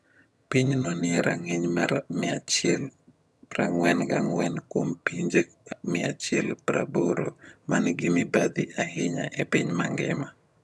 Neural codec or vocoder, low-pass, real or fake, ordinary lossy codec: vocoder, 22.05 kHz, 80 mel bands, HiFi-GAN; none; fake; none